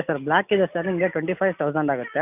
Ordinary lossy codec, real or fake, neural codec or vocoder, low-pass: none; real; none; 3.6 kHz